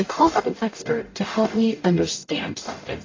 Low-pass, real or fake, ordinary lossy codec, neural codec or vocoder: 7.2 kHz; fake; AAC, 32 kbps; codec, 44.1 kHz, 0.9 kbps, DAC